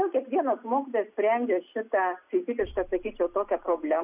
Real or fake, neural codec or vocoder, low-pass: real; none; 3.6 kHz